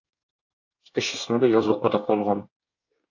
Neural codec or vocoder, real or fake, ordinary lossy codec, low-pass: codec, 24 kHz, 1 kbps, SNAC; fake; AAC, 48 kbps; 7.2 kHz